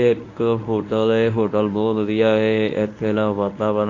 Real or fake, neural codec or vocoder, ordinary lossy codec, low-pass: fake; codec, 24 kHz, 0.9 kbps, WavTokenizer, medium speech release version 1; AAC, 48 kbps; 7.2 kHz